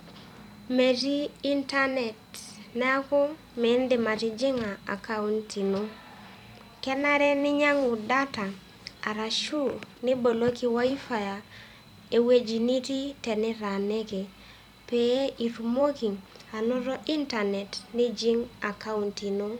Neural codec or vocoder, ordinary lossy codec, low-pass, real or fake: vocoder, 44.1 kHz, 128 mel bands every 256 samples, BigVGAN v2; none; 19.8 kHz; fake